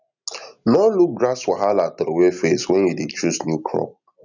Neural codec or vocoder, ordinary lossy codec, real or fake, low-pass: none; none; real; 7.2 kHz